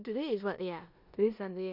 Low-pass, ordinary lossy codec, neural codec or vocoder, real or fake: 5.4 kHz; none; codec, 16 kHz in and 24 kHz out, 0.9 kbps, LongCat-Audio-Codec, four codebook decoder; fake